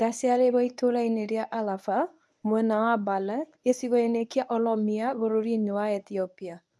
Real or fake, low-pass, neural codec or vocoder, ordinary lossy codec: fake; none; codec, 24 kHz, 0.9 kbps, WavTokenizer, medium speech release version 1; none